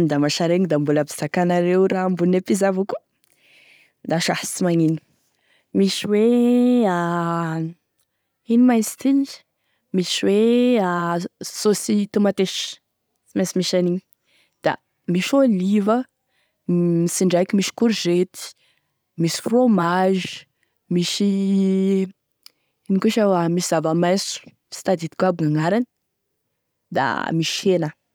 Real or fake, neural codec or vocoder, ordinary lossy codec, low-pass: real; none; none; none